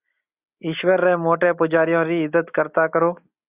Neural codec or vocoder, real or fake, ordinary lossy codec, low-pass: none; real; Opus, 64 kbps; 3.6 kHz